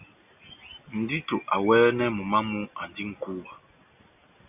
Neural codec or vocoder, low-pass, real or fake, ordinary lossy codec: none; 3.6 kHz; real; AAC, 32 kbps